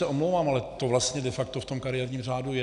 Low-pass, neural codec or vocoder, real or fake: 10.8 kHz; none; real